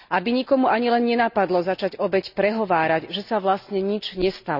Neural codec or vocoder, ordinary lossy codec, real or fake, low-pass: none; none; real; 5.4 kHz